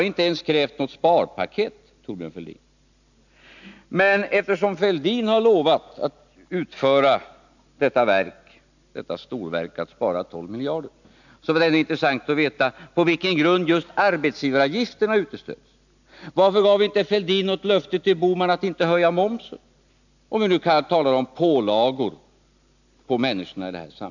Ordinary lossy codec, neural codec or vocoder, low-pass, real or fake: none; none; 7.2 kHz; real